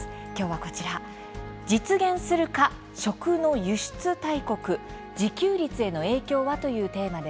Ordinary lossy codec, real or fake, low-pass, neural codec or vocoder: none; real; none; none